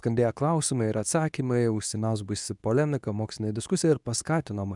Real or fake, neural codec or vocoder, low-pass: fake; codec, 24 kHz, 0.9 kbps, WavTokenizer, medium speech release version 2; 10.8 kHz